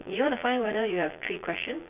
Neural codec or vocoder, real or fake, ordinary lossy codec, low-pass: vocoder, 22.05 kHz, 80 mel bands, Vocos; fake; none; 3.6 kHz